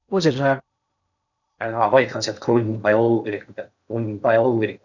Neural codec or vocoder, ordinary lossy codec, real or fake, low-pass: codec, 16 kHz in and 24 kHz out, 0.8 kbps, FocalCodec, streaming, 65536 codes; none; fake; 7.2 kHz